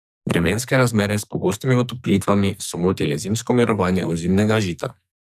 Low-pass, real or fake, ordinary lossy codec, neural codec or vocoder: 14.4 kHz; fake; none; codec, 44.1 kHz, 2.6 kbps, SNAC